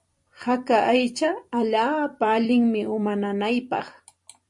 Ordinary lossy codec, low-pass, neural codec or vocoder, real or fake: MP3, 48 kbps; 10.8 kHz; vocoder, 44.1 kHz, 128 mel bands every 256 samples, BigVGAN v2; fake